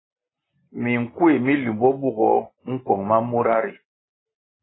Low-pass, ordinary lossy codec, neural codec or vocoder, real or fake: 7.2 kHz; AAC, 16 kbps; none; real